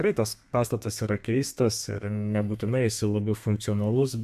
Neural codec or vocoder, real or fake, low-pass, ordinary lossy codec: codec, 32 kHz, 1.9 kbps, SNAC; fake; 14.4 kHz; AAC, 96 kbps